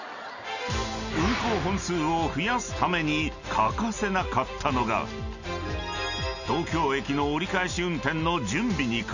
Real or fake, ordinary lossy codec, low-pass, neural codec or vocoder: real; none; 7.2 kHz; none